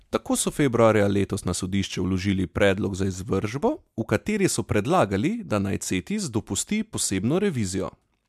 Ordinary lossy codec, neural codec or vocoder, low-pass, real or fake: MP3, 96 kbps; none; 14.4 kHz; real